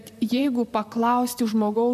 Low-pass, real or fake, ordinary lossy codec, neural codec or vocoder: 14.4 kHz; fake; MP3, 96 kbps; vocoder, 44.1 kHz, 128 mel bands, Pupu-Vocoder